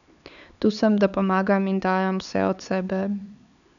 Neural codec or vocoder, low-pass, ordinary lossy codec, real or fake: codec, 16 kHz, 4 kbps, X-Codec, HuBERT features, trained on LibriSpeech; 7.2 kHz; none; fake